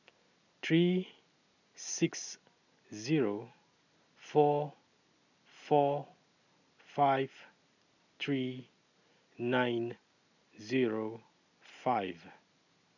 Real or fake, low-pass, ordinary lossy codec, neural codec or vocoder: real; 7.2 kHz; none; none